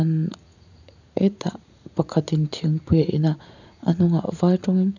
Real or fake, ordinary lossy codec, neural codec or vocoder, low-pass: fake; none; vocoder, 44.1 kHz, 80 mel bands, Vocos; 7.2 kHz